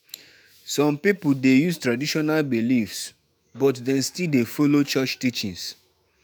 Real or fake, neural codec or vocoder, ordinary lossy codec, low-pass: fake; autoencoder, 48 kHz, 128 numbers a frame, DAC-VAE, trained on Japanese speech; none; none